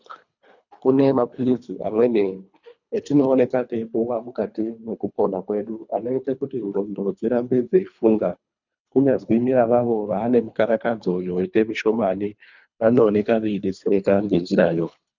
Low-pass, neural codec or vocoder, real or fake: 7.2 kHz; codec, 24 kHz, 3 kbps, HILCodec; fake